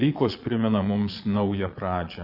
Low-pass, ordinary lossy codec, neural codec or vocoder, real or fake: 5.4 kHz; Opus, 64 kbps; vocoder, 22.05 kHz, 80 mel bands, Vocos; fake